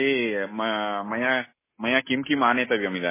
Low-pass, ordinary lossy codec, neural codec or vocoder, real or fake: 3.6 kHz; MP3, 16 kbps; none; real